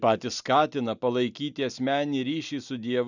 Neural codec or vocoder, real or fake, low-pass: none; real; 7.2 kHz